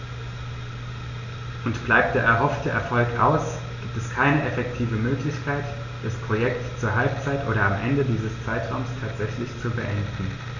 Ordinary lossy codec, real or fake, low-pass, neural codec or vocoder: none; real; 7.2 kHz; none